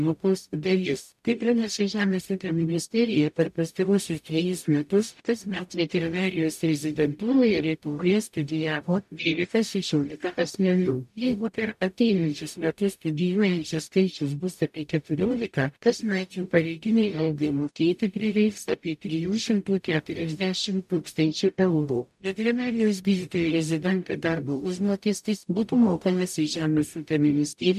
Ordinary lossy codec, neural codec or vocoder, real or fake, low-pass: MP3, 96 kbps; codec, 44.1 kHz, 0.9 kbps, DAC; fake; 14.4 kHz